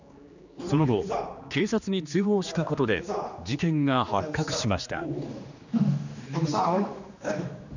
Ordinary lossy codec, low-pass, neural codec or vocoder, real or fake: none; 7.2 kHz; codec, 16 kHz, 2 kbps, X-Codec, HuBERT features, trained on general audio; fake